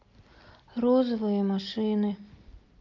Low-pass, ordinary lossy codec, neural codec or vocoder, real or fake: 7.2 kHz; Opus, 24 kbps; none; real